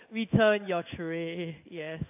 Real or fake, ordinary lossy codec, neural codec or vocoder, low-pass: fake; AAC, 32 kbps; codec, 16 kHz in and 24 kHz out, 1 kbps, XY-Tokenizer; 3.6 kHz